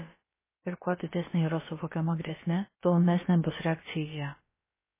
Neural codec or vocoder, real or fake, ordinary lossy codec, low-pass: codec, 16 kHz, about 1 kbps, DyCAST, with the encoder's durations; fake; MP3, 16 kbps; 3.6 kHz